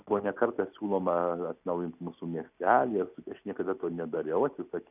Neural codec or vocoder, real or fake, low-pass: none; real; 3.6 kHz